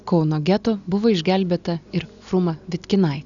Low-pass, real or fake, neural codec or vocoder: 7.2 kHz; real; none